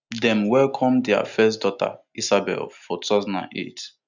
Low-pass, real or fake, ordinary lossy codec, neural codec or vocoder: 7.2 kHz; real; none; none